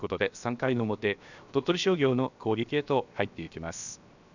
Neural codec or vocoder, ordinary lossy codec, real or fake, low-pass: codec, 16 kHz, 0.7 kbps, FocalCodec; none; fake; 7.2 kHz